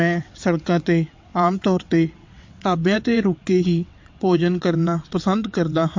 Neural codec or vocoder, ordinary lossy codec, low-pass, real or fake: vocoder, 22.05 kHz, 80 mel bands, WaveNeXt; MP3, 48 kbps; 7.2 kHz; fake